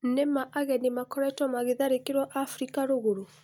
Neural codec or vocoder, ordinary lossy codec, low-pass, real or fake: none; none; 19.8 kHz; real